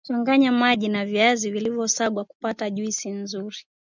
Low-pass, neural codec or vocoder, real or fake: 7.2 kHz; none; real